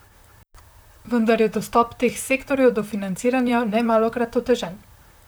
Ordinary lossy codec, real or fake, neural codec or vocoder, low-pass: none; fake; vocoder, 44.1 kHz, 128 mel bands, Pupu-Vocoder; none